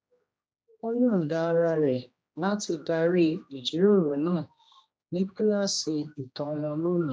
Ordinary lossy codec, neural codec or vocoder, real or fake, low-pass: none; codec, 16 kHz, 1 kbps, X-Codec, HuBERT features, trained on general audio; fake; none